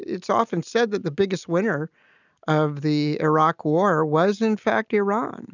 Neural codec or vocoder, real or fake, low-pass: none; real; 7.2 kHz